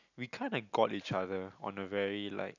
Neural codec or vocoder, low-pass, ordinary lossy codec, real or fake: none; 7.2 kHz; none; real